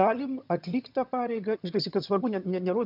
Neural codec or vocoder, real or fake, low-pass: vocoder, 22.05 kHz, 80 mel bands, HiFi-GAN; fake; 5.4 kHz